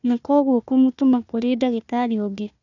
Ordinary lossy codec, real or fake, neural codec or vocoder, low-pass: none; fake; codec, 16 kHz, 1 kbps, FunCodec, trained on Chinese and English, 50 frames a second; 7.2 kHz